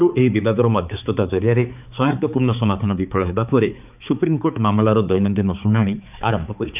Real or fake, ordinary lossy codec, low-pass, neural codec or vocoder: fake; none; 3.6 kHz; codec, 16 kHz, 4 kbps, X-Codec, HuBERT features, trained on balanced general audio